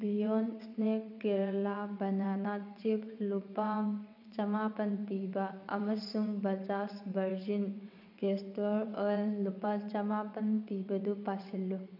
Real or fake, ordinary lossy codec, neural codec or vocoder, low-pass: fake; none; vocoder, 22.05 kHz, 80 mel bands, WaveNeXt; 5.4 kHz